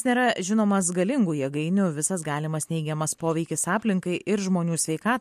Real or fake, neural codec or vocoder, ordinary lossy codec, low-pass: real; none; MP3, 64 kbps; 14.4 kHz